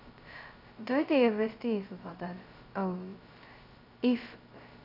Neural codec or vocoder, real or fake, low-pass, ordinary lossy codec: codec, 16 kHz, 0.2 kbps, FocalCodec; fake; 5.4 kHz; none